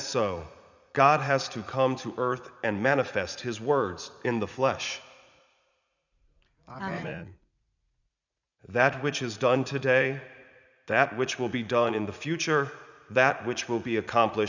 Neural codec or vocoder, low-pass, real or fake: vocoder, 44.1 kHz, 80 mel bands, Vocos; 7.2 kHz; fake